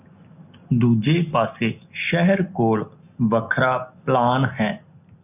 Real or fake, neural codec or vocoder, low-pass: real; none; 3.6 kHz